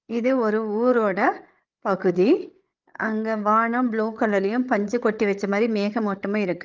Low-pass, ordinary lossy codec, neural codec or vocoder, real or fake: 7.2 kHz; Opus, 24 kbps; codec, 16 kHz, 8 kbps, FreqCodec, larger model; fake